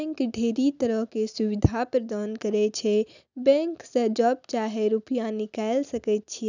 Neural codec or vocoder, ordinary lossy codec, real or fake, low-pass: none; none; real; 7.2 kHz